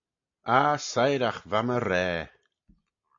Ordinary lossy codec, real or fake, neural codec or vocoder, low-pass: MP3, 48 kbps; real; none; 7.2 kHz